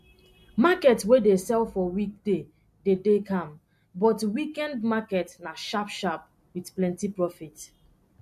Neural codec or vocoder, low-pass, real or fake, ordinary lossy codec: none; 14.4 kHz; real; MP3, 64 kbps